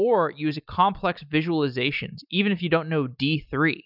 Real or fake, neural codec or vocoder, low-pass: real; none; 5.4 kHz